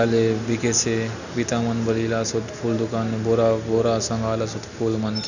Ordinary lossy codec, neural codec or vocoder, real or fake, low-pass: none; none; real; 7.2 kHz